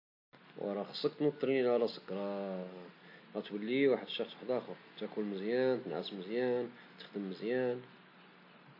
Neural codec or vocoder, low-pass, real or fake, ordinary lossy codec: none; 5.4 kHz; real; AAC, 32 kbps